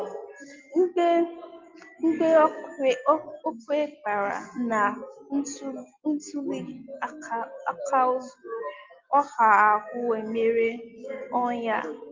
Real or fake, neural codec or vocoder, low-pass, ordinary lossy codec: real; none; 7.2 kHz; Opus, 32 kbps